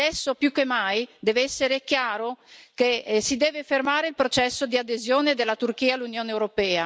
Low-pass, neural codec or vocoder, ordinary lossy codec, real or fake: none; none; none; real